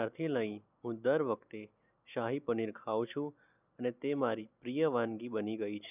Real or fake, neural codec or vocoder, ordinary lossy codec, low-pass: real; none; none; 3.6 kHz